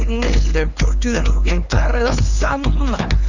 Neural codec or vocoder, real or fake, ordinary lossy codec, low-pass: codec, 16 kHz, 2 kbps, X-Codec, HuBERT features, trained on LibriSpeech; fake; none; 7.2 kHz